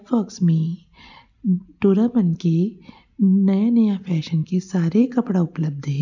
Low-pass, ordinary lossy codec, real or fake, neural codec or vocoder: 7.2 kHz; none; real; none